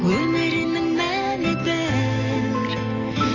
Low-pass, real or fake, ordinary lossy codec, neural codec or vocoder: 7.2 kHz; real; none; none